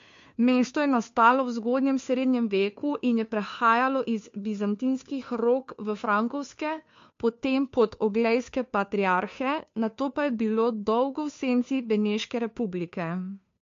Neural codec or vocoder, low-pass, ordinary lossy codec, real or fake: codec, 16 kHz, 2 kbps, FunCodec, trained on Chinese and English, 25 frames a second; 7.2 kHz; MP3, 48 kbps; fake